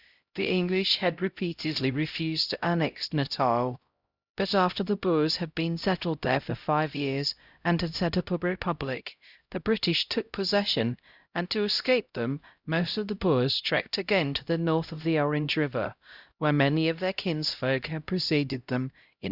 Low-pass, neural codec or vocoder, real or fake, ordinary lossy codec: 5.4 kHz; codec, 16 kHz, 0.5 kbps, X-Codec, HuBERT features, trained on LibriSpeech; fake; Opus, 64 kbps